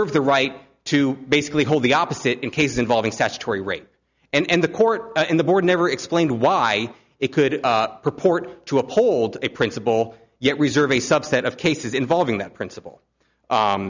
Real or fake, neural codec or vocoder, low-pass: real; none; 7.2 kHz